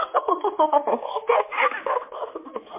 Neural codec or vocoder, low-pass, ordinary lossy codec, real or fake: codec, 16 kHz, 4 kbps, X-Codec, HuBERT features, trained on general audio; 3.6 kHz; MP3, 24 kbps; fake